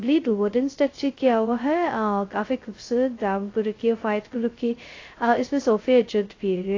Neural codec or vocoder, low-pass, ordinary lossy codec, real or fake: codec, 16 kHz, 0.2 kbps, FocalCodec; 7.2 kHz; AAC, 32 kbps; fake